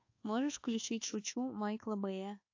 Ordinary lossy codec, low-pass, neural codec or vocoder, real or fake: AAC, 48 kbps; 7.2 kHz; codec, 24 kHz, 1.2 kbps, DualCodec; fake